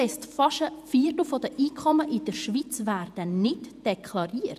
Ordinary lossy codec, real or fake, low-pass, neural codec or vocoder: none; fake; 14.4 kHz; vocoder, 48 kHz, 128 mel bands, Vocos